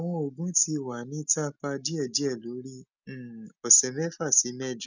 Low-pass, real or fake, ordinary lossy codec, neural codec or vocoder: 7.2 kHz; real; none; none